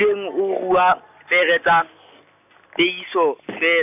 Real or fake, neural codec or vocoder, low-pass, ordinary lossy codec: real; none; 3.6 kHz; none